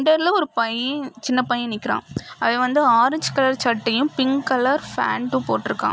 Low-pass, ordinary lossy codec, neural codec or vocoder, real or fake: none; none; none; real